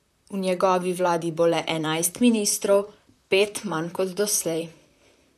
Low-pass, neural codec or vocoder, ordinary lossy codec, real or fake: 14.4 kHz; vocoder, 44.1 kHz, 128 mel bands, Pupu-Vocoder; none; fake